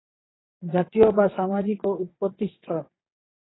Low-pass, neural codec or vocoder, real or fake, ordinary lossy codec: 7.2 kHz; vocoder, 22.05 kHz, 80 mel bands, Vocos; fake; AAC, 16 kbps